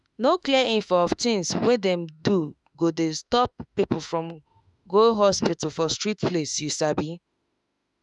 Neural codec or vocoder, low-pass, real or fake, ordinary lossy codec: autoencoder, 48 kHz, 32 numbers a frame, DAC-VAE, trained on Japanese speech; 10.8 kHz; fake; none